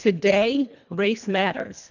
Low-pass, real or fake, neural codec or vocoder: 7.2 kHz; fake; codec, 24 kHz, 1.5 kbps, HILCodec